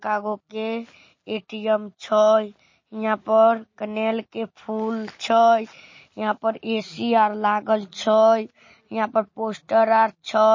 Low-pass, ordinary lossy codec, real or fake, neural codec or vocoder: 7.2 kHz; MP3, 32 kbps; real; none